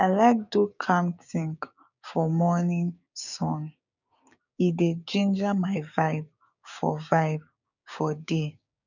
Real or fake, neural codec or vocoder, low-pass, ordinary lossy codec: fake; codec, 16 kHz, 6 kbps, DAC; 7.2 kHz; none